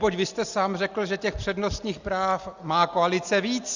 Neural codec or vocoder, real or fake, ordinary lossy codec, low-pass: none; real; Opus, 64 kbps; 7.2 kHz